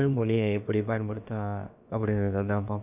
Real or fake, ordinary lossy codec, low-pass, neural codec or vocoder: fake; none; 3.6 kHz; codec, 16 kHz, about 1 kbps, DyCAST, with the encoder's durations